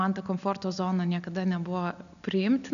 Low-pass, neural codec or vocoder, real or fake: 7.2 kHz; none; real